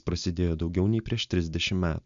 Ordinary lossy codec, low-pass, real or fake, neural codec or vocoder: Opus, 64 kbps; 7.2 kHz; real; none